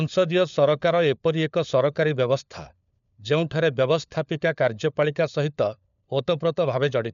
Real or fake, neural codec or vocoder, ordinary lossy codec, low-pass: fake; codec, 16 kHz, 4 kbps, FunCodec, trained on LibriTTS, 50 frames a second; none; 7.2 kHz